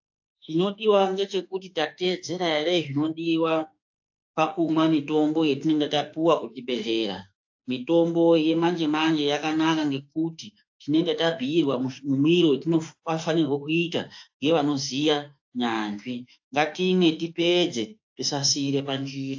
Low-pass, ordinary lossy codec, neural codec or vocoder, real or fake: 7.2 kHz; AAC, 48 kbps; autoencoder, 48 kHz, 32 numbers a frame, DAC-VAE, trained on Japanese speech; fake